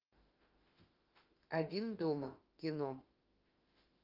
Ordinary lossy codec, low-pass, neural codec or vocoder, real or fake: Opus, 32 kbps; 5.4 kHz; autoencoder, 48 kHz, 32 numbers a frame, DAC-VAE, trained on Japanese speech; fake